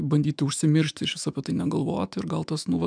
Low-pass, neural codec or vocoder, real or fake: 9.9 kHz; autoencoder, 48 kHz, 128 numbers a frame, DAC-VAE, trained on Japanese speech; fake